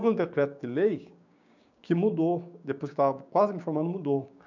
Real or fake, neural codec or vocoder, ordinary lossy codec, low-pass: fake; codec, 16 kHz, 6 kbps, DAC; none; 7.2 kHz